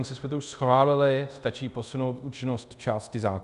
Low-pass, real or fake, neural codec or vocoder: 10.8 kHz; fake; codec, 24 kHz, 0.5 kbps, DualCodec